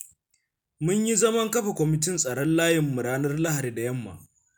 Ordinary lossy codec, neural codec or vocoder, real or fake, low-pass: none; none; real; none